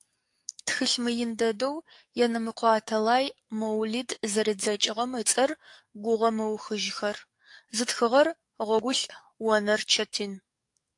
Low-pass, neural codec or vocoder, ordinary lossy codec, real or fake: 10.8 kHz; codec, 44.1 kHz, 7.8 kbps, DAC; AAC, 64 kbps; fake